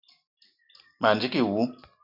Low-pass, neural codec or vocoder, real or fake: 5.4 kHz; none; real